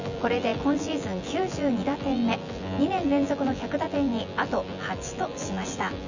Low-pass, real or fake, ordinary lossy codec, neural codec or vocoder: 7.2 kHz; fake; none; vocoder, 24 kHz, 100 mel bands, Vocos